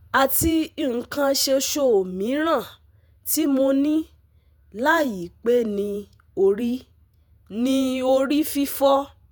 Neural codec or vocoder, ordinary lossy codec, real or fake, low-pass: vocoder, 48 kHz, 128 mel bands, Vocos; none; fake; none